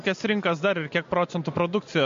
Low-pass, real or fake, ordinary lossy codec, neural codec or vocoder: 7.2 kHz; real; MP3, 48 kbps; none